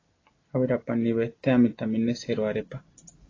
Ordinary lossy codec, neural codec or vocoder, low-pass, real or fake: AAC, 32 kbps; none; 7.2 kHz; real